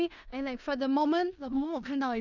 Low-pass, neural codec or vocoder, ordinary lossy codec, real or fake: 7.2 kHz; codec, 16 kHz in and 24 kHz out, 0.9 kbps, LongCat-Audio-Codec, four codebook decoder; none; fake